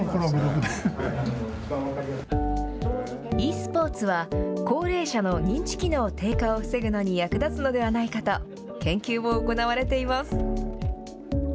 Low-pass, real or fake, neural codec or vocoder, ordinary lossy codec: none; real; none; none